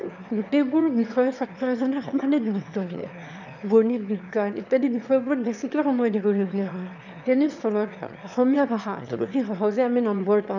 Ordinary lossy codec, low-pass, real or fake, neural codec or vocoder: none; 7.2 kHz; fake; autoencoder, 22.05 kHz, a latent of 192 numbers a frame, VITS, trained on one speaker